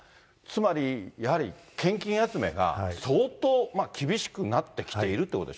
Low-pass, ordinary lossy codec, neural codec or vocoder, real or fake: none; none; none; real